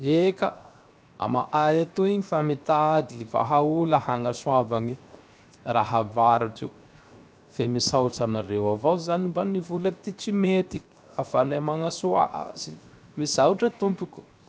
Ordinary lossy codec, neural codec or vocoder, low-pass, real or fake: none; codec, 16 kHz, 0.7 kbps, FocalCodec; none; fake